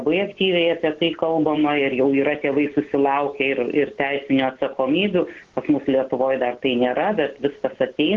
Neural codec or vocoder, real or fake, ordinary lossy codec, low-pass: none; real; Opus, 16 kbps; 7.2 kHz